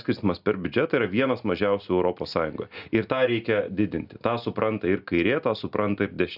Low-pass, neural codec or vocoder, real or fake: 5.4 kHz; none; real